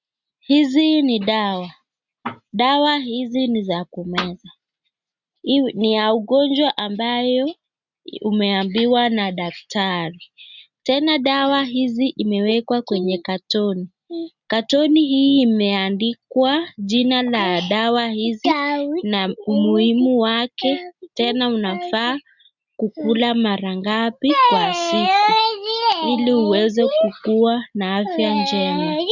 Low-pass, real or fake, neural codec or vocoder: 7.2 kHz; real; none